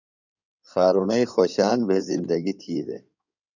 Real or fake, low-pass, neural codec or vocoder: fake; 7.2 kHz; codec, 16 kHz in and 24 kHz out, 2.2 kbps, FireRedTTS-2 codec